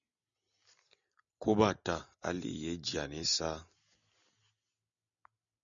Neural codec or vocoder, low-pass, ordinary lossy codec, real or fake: none; 7.2 kHz; MP3, 96 kbps; real